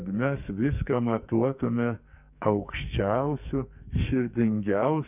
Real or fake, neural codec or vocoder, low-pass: fake; codec, 44.1 kHz, 2.6 kbps, SNAC; 3.6 kHz